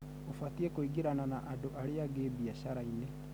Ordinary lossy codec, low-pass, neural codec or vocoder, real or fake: none; none; none; real